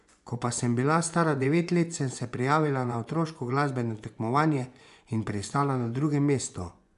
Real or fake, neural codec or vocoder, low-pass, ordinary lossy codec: fake; vocoder, 24 kHz, 100 mel bands, Vocos; 10.8 kHz; none